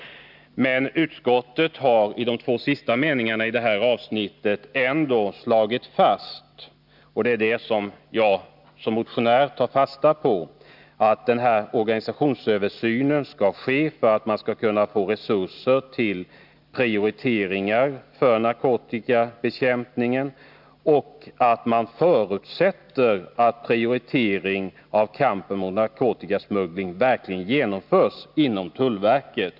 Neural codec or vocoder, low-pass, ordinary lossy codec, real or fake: none; 5.4 kHz; none; real